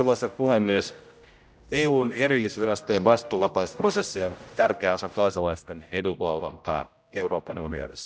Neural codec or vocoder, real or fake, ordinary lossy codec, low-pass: codec, 16 kHz, 0.5 kbps, X-Codec, HuBERT features, trained on general audio; fake; none; none